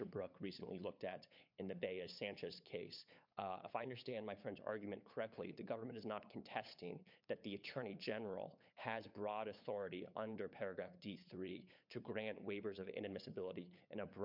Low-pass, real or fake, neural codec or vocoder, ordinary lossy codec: 5.4 kHz; fake; codec, 16 kHz, 8 kbps, FunCodec, trained on LibriTTS, 25 frames a second; MP3, 48 kbps